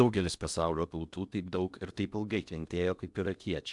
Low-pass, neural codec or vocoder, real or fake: 10.8 kHz; codec, 16 kHz in and 24 kHz out, 0.8 kbps, FocalCodec, streaming, 65536 codes; fake